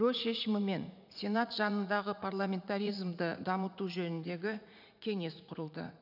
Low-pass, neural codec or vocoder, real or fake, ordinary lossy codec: 5.4 kHz; vocoder, 44.1 kHz, 80 mel bands, Vocos; fake; none